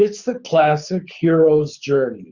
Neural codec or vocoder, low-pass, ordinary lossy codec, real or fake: codec, 24 kHz, 6 kbps, HILCodec; 7.2 kHz; Opus, 64 kbps; fake